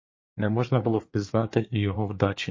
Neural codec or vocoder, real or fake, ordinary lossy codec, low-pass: codec, 24 kHz, 1 kbps, SNAC; fake; MP3, 32 kbps; 7.2 kHz